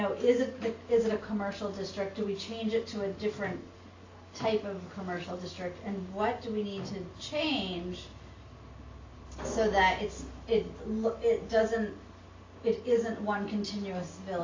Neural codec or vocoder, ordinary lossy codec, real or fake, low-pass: none; AAC, 32 kbps; real; 7.2 kHz